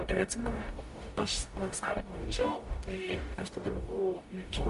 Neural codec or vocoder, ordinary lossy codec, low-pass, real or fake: codec, 44.1 kHz, 0.9 kbps, DAC; MP3, 48 kbps; 14.4 kHz; fake